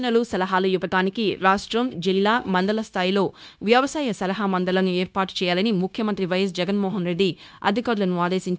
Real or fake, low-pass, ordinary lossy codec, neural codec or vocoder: fake; none; none; codec, 16 kHz, 0.9 kbps, LongCat-Audio-Codec